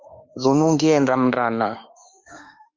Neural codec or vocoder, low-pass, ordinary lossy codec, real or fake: autoencoder, 48 kHz, 32 numbers a frame, DAC-VAE, trained on Japanese speech; 7.2 kHz; Opus, 32 kbps; fake